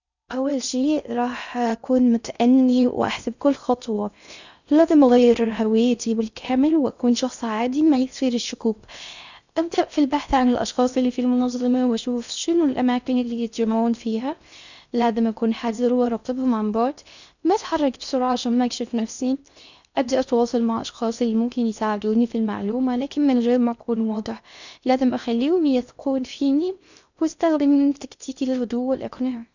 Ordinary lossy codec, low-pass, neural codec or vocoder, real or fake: none; 7.2 kHz; codec, 16 kHz in and 24 kHz out, 0.6 kbps, FocalCodec, streaming, 4096 codes; fake